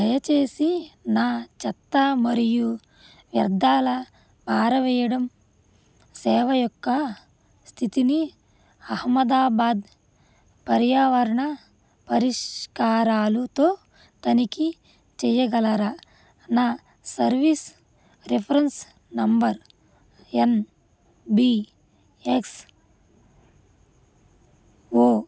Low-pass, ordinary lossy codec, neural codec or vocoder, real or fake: none; none; none; real